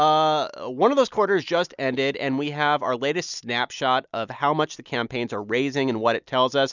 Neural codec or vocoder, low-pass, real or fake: none; 7.2 kHz; real